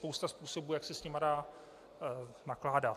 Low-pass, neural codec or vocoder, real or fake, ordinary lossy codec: 14.4 kHz; none; real; AAC, 64 kbps